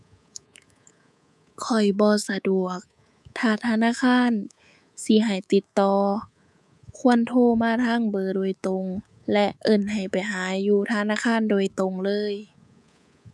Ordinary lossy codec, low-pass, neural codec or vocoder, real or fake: none; none; codec, 24 kHz, 3.1 kbps, DualCodec; fake